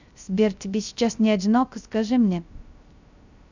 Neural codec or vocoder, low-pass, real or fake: codec, 16 kHz, 0.3 kbps, FocalCodec; 7.2 kHz; fake